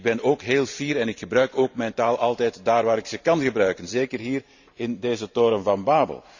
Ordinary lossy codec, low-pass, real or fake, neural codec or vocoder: none; 7.2 kHz; fake; vocoder, 44.1 kHz, 128 mel bands every 512 samples, BigVGAN v2